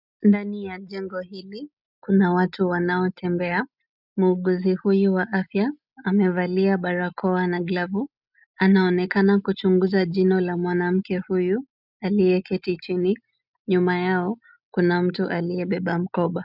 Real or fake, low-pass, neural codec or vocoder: real; 5.4 kHz; none